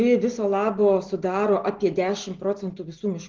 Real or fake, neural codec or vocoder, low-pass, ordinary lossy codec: real; none; 7.2 kHz; Opus, 16 kbps